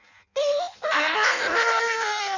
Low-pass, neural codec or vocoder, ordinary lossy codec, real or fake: 7.2 kHz; codec, 16 kHz in and 24 kHz out, 0.6 kbps, FireRedTTS-2 codec; none; fake